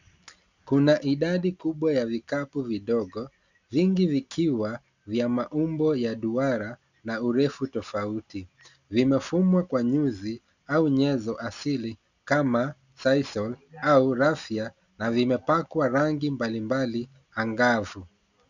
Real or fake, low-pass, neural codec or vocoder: real; 7.2 kHz; none